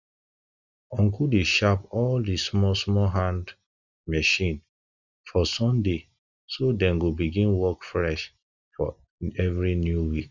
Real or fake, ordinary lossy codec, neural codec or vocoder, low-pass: real; none; none; 7.2 kHz